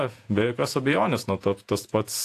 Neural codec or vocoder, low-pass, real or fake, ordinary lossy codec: vocoder, 48 kHz, 128 mel bands, Vocos; 14.4 kHz; fake; AAC, 64 kbps